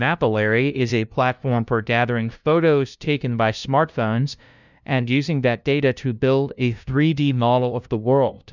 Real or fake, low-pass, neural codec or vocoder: fake; 7.2 kHz; codec, 16 kHz, 0.5 kbps, FunCodec, trained on LibriTTS, 25 frames a second